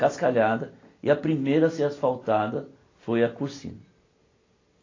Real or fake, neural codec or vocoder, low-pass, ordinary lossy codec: real; none; 7.2 kHz; AAC, 32 kbps